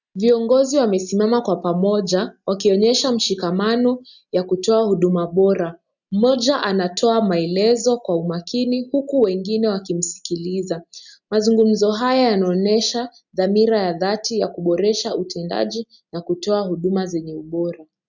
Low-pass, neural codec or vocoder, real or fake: 7.2 kHz; none; real